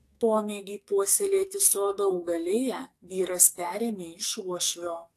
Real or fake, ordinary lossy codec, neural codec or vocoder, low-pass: fake; AAC, 64 kbps; codec, 44.1 kHz, 2.6 kbps, SNAC; 14.4 kHz